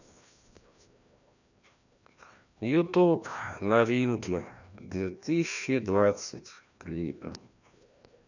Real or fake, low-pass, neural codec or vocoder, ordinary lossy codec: fake; 7.2 kHz; codec, 16 kHz, 1 kbps, FreqCodec, larger model; none